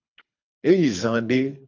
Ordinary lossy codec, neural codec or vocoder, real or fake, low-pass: AAC, 48 kbps; codec, 24 kHz, 3 kbps, HILCodec; fake; 7.2 kHz